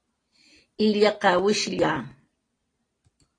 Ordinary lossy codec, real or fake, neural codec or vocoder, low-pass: AAC, 32 kbps; real; none; 9.9 kHz